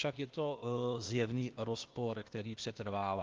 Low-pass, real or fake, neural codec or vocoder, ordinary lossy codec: 7.2 kHz; fake; codec, 16 kHz, 0.8 kbps, ZipCodec; Opus, 24 kbps